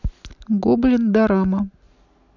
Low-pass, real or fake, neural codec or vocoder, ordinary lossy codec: 7.2 kHz; real; none; none